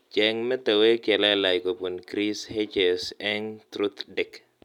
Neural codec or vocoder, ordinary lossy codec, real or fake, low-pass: none; none; real; 19.8 kHz